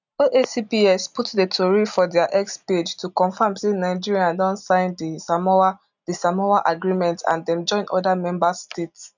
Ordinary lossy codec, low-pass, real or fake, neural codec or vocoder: none; 7.2 kHz; real; none